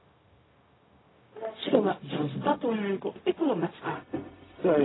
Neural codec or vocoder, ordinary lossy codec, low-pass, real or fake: codec, 16 kHz, 0.4 kbps, LongCat-Audio-Codec; AAC, 16 kbps; 7.2 kHz; fake